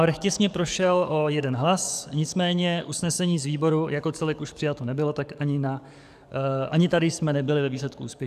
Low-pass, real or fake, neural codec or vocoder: 14.4 kHz; fake; codec, 44.1 kHz, 7.8 kbps, DAC